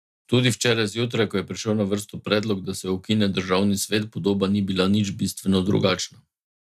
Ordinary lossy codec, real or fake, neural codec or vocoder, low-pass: none; real; none; 14.4 kHz